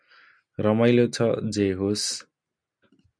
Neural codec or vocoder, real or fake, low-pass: none; real; 9.9 kHz